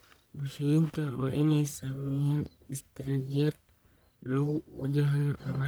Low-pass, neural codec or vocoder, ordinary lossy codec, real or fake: none; codec, 44.1 kHz, 1.7 kbps, Pupu-Codec; none; fake